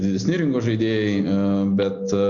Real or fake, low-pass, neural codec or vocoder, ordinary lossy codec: real; 7.2 kHz; none; Opus, 64 kbps